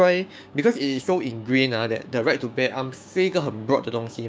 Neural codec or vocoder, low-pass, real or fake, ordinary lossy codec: codec, 16 kHz, 6 kbps, DAC; none; fake; none